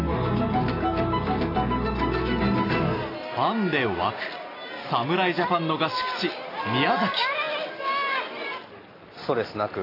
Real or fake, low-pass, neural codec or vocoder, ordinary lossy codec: real; 5.4 kHz; none; AAC, 24 kbps